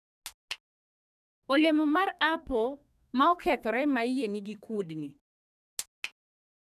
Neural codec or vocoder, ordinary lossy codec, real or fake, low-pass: codec, 32 kHz, 1.9 kbps, SNAC; none; fake; 14.4 kHz